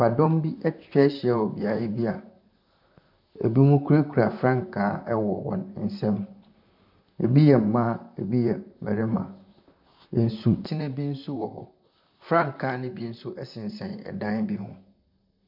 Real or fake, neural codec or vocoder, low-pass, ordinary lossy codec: fake; vocoder, 44.1 kHz, 128 mel bands, Pupu-Vocoder; 5.4 kHz; MP3, 48 kbps